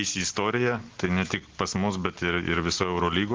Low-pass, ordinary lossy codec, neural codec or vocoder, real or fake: 7.2 kHz; Opus, 16 kbps; none; real